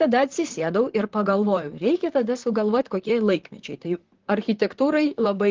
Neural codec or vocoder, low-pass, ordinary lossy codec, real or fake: vocoder, 44.1 kHz, 128 mel bands, Pupu-Vocoder; 7.2 kHz; Opus, 16 kbps; fake